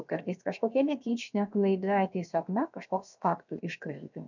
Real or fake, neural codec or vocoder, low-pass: fake; codec, 16 kHz, about 1 kbps, DyCAST, with the encoder's durations; 7.2 kHz